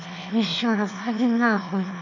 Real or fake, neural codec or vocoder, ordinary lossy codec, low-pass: fake; autoencoder, 22.05 kHz, a latent of 192 numbers a frame, VITS, trained on one speaker; MP3, 64 kbps; 7.2 kHz